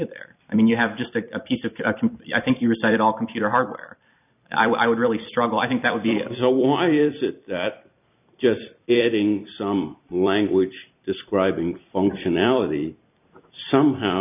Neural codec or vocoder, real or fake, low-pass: vocoder, 44.1 kHz, 128 mel bands every 256 samples, BigVGAN v2; fake; 3.6 kHz